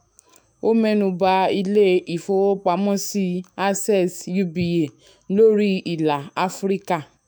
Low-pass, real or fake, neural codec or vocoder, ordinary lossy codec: none; fake; autoencoder, 48 kHz, 128 numbers a frame, DAC-VAE, trained on Japanese speech; none